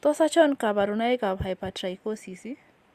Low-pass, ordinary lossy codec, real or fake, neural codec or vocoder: 14.4 kHz; Opus, 64 kbps; real; none